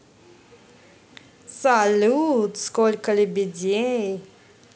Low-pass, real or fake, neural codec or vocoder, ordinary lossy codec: none; real; none; none